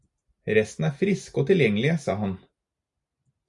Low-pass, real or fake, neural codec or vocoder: 10.8 kHz; real; none